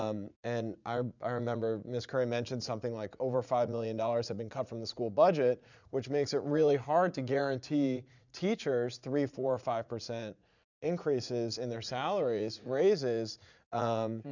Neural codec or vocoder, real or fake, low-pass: vocoder, 44.1 kHz, 80 mel bands, Vocos; fake; 7.2 kHz